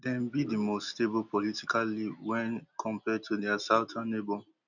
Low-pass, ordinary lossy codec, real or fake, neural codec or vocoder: 7.2 kHz; none; real; none